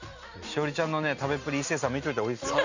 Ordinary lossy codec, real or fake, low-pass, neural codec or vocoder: none; real; 7.2 kHz; none